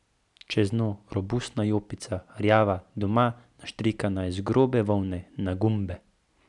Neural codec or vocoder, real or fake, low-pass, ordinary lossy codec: none; real; 10.8 kHz; none